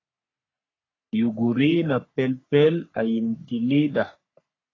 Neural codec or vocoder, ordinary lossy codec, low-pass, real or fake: codec, 44.1 kHz, 3.4 kbps, Pupu-Codec; AAC, 32 kbps; 7.2 kHz; fake